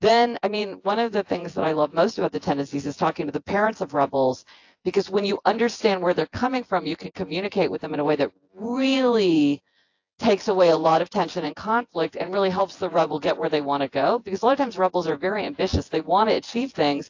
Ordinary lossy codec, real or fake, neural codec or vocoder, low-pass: AAC, 48 kbps; fake; vocoder, 24 kHz, 100 mel bands, Vocos; 7.2 kHz